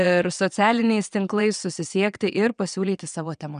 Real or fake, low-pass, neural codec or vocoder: fake; 9.9 kHz; vocoder, 22.05 kHz, 80 mel bands, WaveNeXt